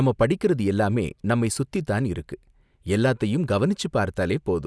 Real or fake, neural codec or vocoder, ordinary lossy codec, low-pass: real; none; none; none